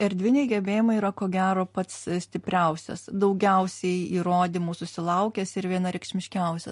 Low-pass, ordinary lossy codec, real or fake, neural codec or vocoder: 10.8 kHz; MP3, 48 kbps; real; none